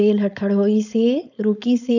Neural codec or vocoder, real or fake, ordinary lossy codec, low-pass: codec, 16 kHz, 4.8 kbps, FACodec; fake; none; 7.2 kHz